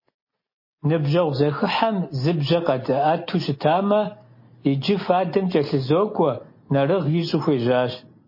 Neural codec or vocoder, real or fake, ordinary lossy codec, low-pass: none; real; MP3, 24 kbps; 5.4 kHz